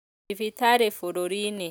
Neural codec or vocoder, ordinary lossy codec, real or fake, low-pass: none; none; real; none